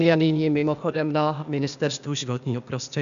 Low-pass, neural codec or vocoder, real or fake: 7.2 kHz; codec, 16 kHz, 0.8 kbps, ZipCodec; fake